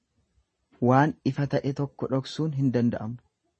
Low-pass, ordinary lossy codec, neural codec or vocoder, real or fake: 10.8 kHz; MP3, 32 kbps; none; real